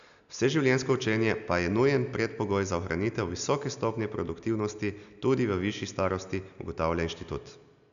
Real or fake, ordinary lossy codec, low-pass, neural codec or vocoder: real; none; 7.2 kHz; none